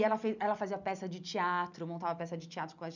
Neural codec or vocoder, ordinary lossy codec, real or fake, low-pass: none; none; real; 7.2 kHz